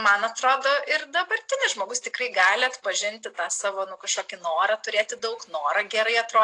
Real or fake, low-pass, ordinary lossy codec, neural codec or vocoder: real; 10.8 kHz; AAC, 48 kbps; none